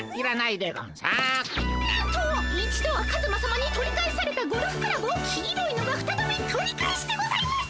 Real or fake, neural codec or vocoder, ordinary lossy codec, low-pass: real; none; none; none